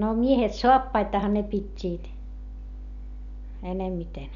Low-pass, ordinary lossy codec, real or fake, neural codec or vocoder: 7.2 kHz; none; real; none